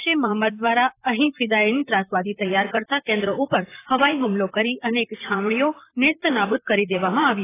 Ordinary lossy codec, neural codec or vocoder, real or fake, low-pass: AAC, 16 kbps; vocoder, 44.1 kHz, 128 mel bands, Pupu-Vocoder; fake; 3.6 kHz